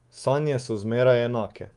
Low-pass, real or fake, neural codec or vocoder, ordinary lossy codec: 10.8 kHz; fake; codec, 24 kHz, 3.1 kbps, DualCodec; Opus, 32 kbps